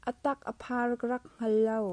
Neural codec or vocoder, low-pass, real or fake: none; 9.9 kHz; real